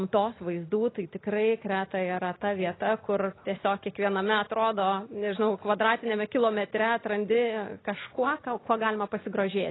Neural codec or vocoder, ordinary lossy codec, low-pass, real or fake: none; AAC, 16 kbps; 7.2 kHz; real